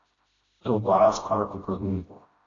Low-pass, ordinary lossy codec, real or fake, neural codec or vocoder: 7.2 kHz; AAC, 32 kbps; fake; codec, 16 kHz, 0.5 kbps, FreqCodec, smaller model